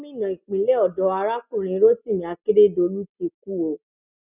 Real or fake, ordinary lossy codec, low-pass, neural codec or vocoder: real; none; 3.6 kHz; none